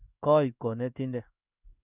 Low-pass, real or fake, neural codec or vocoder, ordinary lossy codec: 3.6 kHz; fake; codec, 16 kHz in and 24 kHz out, 1 kbps, XY-Tokenizer; none